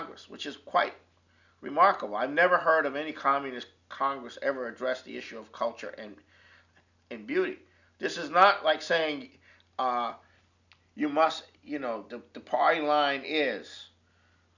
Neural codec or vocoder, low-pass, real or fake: none; 7.2 kHz; real